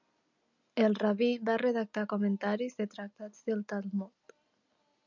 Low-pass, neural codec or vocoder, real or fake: 7.2 kHz; none; real